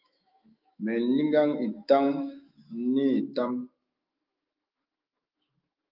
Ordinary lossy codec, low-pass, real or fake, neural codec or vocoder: Opus, 24 kbps; 5.4 kHz; fake; autoencoder, 48 kHz, 128 numbers a frame, DAC-VAE, trained on Japanese speech